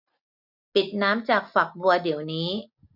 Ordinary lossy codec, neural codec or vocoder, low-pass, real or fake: none; none; 5.4 kHz; real